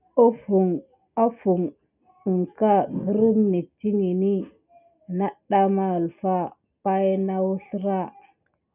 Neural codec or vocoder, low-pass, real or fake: none; 3.6 kHz; real